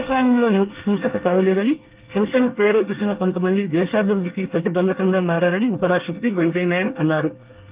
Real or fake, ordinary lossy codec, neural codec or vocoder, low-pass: fake; Opus, 32 kbps; codec, 24 kHz, 1 kbps, SNAC; 3.6 kHz